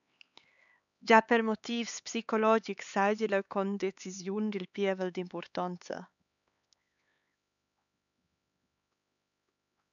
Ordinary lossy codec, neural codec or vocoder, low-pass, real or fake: MP3, 96 kbps; codec, 16 kHz, 4 kbps, X-Codec, HuBERT features, trained on LibriSpeech; 7.2 kHz; fake